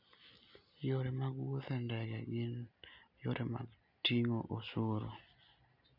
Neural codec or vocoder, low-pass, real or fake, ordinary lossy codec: none; 5.4 kHz; real; none